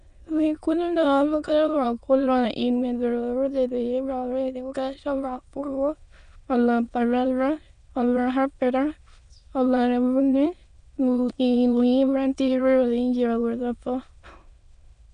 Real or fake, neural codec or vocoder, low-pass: fake; autoencoder, 22.05 kHz, a latent of 192 numbers a frame, VITS, trained on many speakers; 9.9 kHz